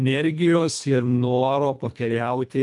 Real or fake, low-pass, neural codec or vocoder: fake; 10.8 kHz; codec, 24 kHz, 1.5 kbps, HILCodec